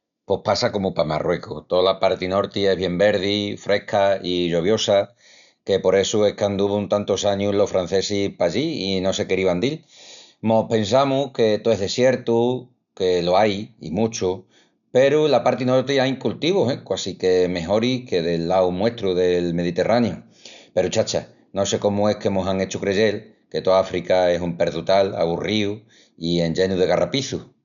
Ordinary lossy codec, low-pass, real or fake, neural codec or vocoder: none; 7.2 kHz; real; none